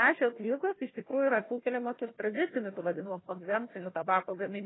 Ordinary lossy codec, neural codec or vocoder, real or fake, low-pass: AAC, 16 kbps; codec, 16 kHz, 1 kbps, FunCodec, trained on Chinese and English, 50 frames a second; fake; 7.2 kHz